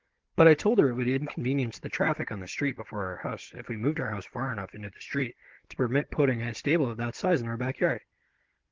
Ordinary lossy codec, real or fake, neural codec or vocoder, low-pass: Opus, 16 kbps; fake; codec, 16 kHz, 16 kbps, FunCodec, trained on Chinese and English, 50 frames a second; 7.2 kHz